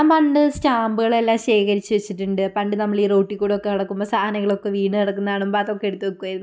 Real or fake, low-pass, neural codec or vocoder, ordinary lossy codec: real; none; none; none